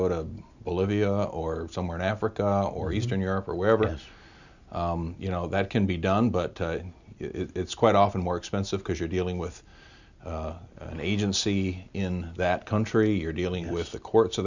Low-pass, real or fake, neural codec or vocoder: 7.2 kHz; real; none